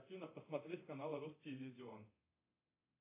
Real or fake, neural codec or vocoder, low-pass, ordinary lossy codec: fake; codec, 24 kHz, 0.9 kbps, DualCodec; 3.6 kHz; AAC, 24 kbps